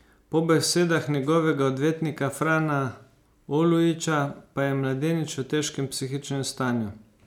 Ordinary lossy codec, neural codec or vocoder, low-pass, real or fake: none; none; 19.8 kHz; real